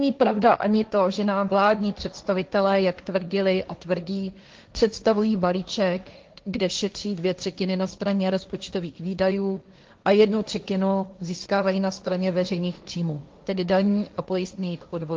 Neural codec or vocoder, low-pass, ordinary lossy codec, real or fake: codec, 16 kHz, 1.1 kbps, Voila-Tokenizer; 7.2 kHz; Opus, 32 kbps; fake